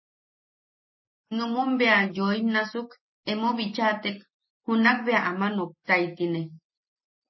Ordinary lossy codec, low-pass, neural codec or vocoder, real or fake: MP3, 24 kbps; 7.2 kHz; none; real